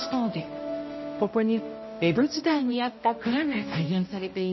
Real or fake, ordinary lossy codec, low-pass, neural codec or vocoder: fake; MP3, 24 kbps; 7.2 kHz; codec, 16 kHz, 0.5 kbps, X-Codec, HuBERT features, trained on balanced general audio